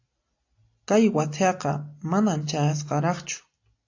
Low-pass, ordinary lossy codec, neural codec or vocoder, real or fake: 7.2 kHz; AAC, 48 kbps; none; real